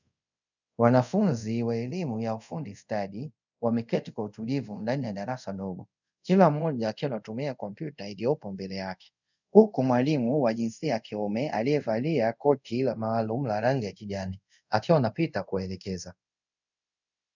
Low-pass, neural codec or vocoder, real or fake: 7.2 kHz; codec, 24 kHz, 0.5 kbps, DualCodec; fake